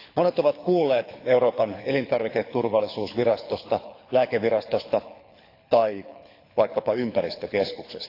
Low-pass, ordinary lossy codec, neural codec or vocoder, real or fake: 5.4 kHz; AAC, 32 kbps; codec, 24 kHz, 6 kbps, HILCodec; fake